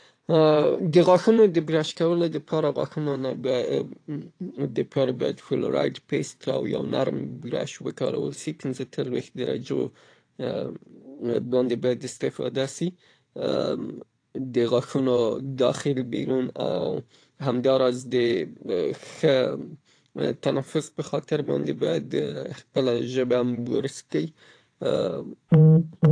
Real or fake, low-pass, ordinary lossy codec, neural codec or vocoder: fake; 9.9 kHz; AAC, 48 kbps; vocoder, 22.05 kHz, 80 mel bands, WaveNeXt